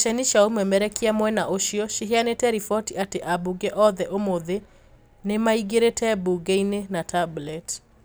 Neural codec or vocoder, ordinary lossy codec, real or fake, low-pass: none; none; real; none